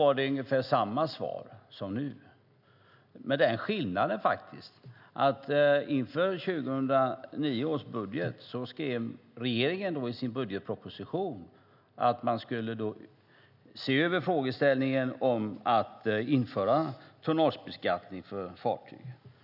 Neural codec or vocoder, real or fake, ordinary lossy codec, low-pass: none; real; none; 5.4 kHz